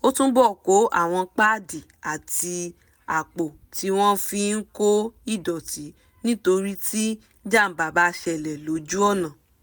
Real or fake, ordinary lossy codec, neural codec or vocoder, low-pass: real; none; none; none